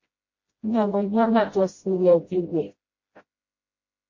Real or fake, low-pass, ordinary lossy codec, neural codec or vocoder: fake; 7.2 kHz; MP3, 32 kbps; codec, 16 kHz, 0.5 kbps, FreqCodec, smaller model